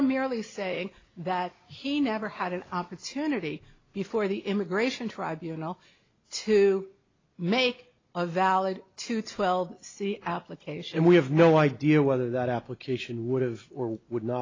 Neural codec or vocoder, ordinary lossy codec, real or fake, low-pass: none; AAC, 32 kbps; real; 7.2 kHz